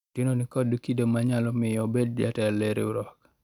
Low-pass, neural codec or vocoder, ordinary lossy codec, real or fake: 19.8 kHz; none; none; real